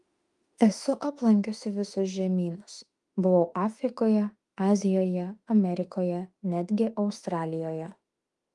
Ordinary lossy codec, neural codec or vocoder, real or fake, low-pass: Opus, 32 kbps; autoencoder, 48 kHz, 32 numbers a frame, DAC-VAE, trained on Japanese speech; fake; 10.8 kHz